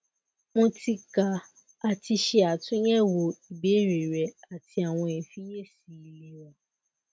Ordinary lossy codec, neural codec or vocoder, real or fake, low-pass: none; none; real; none